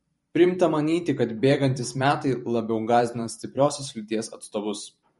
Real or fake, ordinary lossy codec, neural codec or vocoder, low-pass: fake; MP3, 48 kbps; vocoder, 44.1 kHz, 128 mel bands every 512 samples, BigVGAN v2; 19.8 kHz